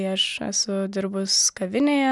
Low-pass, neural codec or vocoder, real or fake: 10.8 kHz; none; real